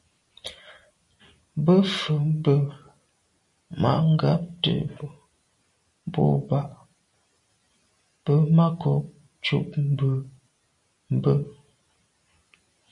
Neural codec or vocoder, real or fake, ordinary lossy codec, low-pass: none; real; MP3, 48 kbps; 10.8 kHz